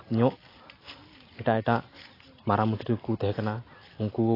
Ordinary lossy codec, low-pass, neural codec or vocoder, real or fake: AAC, 24 kbps; 5.4 kHz; none; real